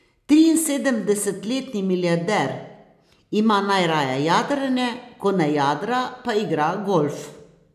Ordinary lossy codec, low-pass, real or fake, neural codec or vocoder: none; 14.4 kHz; real; none